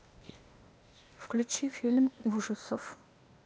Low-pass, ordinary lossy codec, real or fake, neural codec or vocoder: none; none; fake; codec, 16 kHz, 0.8 kbps, ZipCodec